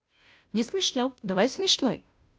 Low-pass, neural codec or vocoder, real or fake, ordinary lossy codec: none; codec, 16 kHz, 0.5 kbps, FunCodec, trained on Chinese and English, 25 frames a second; fake; none